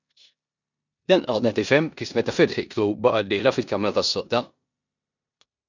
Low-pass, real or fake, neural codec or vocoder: 7.2 kHz; fake; codec, 16 kHz in and 24 kHz out, 0.9 kbps, LongCat-Audio-Codec, four codebook decoder